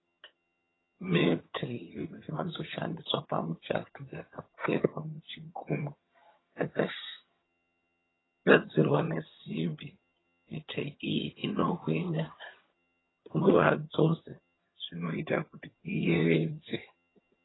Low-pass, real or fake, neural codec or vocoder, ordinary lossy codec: 7.2 kHz; fake; vocoder, 22.05 kHz, 80 mel bands, HiFi-GAN; AAC, 16 kbps